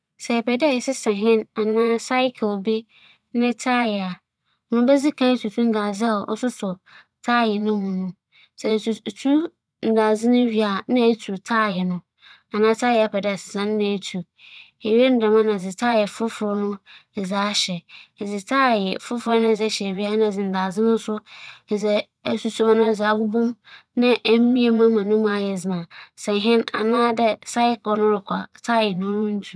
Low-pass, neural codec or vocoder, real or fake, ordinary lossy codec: none; vocoder, 22.05 kHz, 80 mel bands, Vocos; fake; none